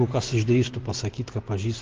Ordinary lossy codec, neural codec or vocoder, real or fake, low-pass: Opus, 16 kbps; none; real; 7.2 kHz